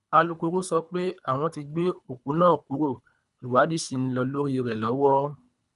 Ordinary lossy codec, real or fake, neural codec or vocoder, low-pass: none; fake; codec, 24 kHz, 3 kbps, HILCodec; 10.8 kHz